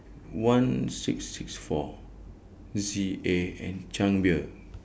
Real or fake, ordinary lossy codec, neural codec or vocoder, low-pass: real; none; none; none